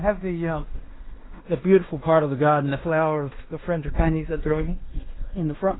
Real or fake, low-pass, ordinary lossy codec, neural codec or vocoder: fake; 7.2 kHz; AAC, 16 kbps; codec, 16 kHz in and 24 kHz out, 0.9 kbps, LongCat-Audio-Codec, fine tuned four codebook decoder